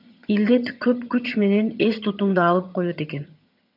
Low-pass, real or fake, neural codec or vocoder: 5.4 kHz; fake; vocoder, 22.05 kHz, 80 mel bands, HiFi-GAN